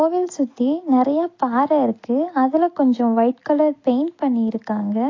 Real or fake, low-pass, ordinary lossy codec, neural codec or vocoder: real; 7.2 kHz; AAC, 32 kbps; none